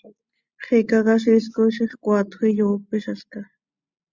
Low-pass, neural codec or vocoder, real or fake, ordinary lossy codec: 7.2 kHz; none; real; Opus, 64 kbps